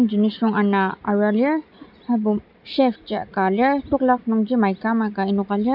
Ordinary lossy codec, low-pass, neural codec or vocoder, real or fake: none; 5.4 kHz; none; real